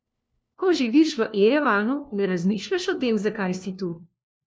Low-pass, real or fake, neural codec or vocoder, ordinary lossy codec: none; fake; codec, 16 kHz, 1 kbps, FunCodec, trained on LibriTTS, 50 frames a second; none